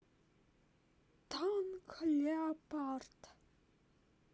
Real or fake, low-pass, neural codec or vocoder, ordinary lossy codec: real; none; none; none